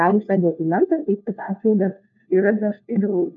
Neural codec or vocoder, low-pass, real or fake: codec, 16 kHz, 1 kbps, FunCodec, trained on LibriTTS, 50 frames a second; 7.2 kHz; fake